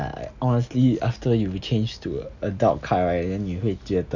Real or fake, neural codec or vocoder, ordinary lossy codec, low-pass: real; none; none; 7.2 kHz